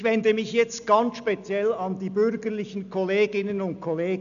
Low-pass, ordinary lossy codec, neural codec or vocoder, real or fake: 7.2 kHz; none; none; real